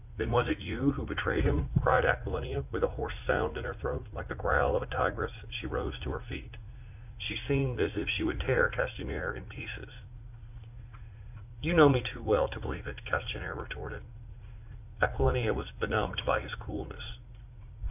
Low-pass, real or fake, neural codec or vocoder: 3.6 kHz; fake; vocoder, 44.1 kHz, 80 mel bands, Vocos